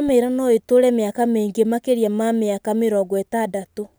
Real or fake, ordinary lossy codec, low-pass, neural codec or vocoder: real; none; none; none